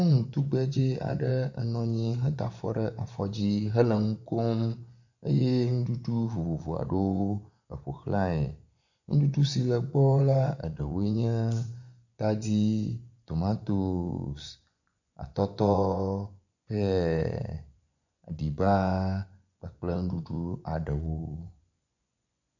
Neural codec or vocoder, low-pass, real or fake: vocoder, 24 kHz, 100 mel bands, Vocos; 7.2 kHz; fake